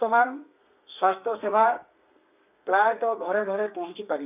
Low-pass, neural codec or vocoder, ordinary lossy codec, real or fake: 3.6 kHz; codec, 32 kHz, 1.9 kbps, SNAC; none; fake